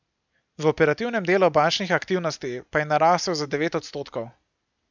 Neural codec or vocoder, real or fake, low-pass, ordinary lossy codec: none; real; 7.2 kHz; none